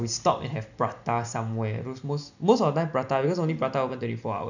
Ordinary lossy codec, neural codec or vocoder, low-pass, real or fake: none; none; 7.2 kHz; real